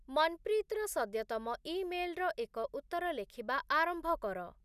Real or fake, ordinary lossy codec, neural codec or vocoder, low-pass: real; none; none; 14.4 kHz